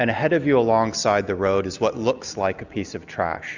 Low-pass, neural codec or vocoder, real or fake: 7.2 kHz; none; real